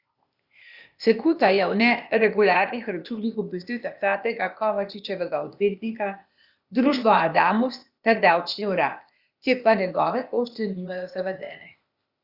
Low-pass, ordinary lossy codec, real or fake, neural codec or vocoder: 5.4 kHz; Opus, 64 kbps; fake; codec, 16 kHz, 0.8 kbps, ZipCodec